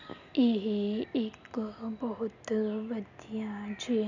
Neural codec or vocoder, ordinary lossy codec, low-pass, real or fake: none; none; 7.2 kHz; real